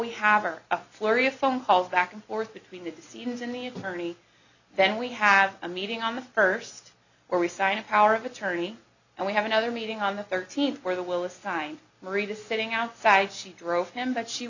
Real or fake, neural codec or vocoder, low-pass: real; none; 7.2 kHz